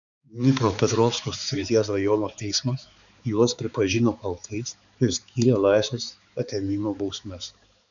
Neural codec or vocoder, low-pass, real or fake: codec, 16 kHz, 4 kbps, X-Codec, HuBERT features, trained on balanced general audio; 7.2 kHz; fake